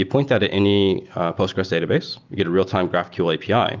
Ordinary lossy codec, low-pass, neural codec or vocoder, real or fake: Opus, 32 kbps; 7.2 kHz; none; real